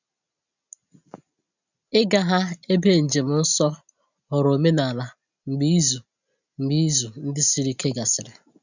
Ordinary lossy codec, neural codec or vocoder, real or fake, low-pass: none; none; real; 7.2 kHz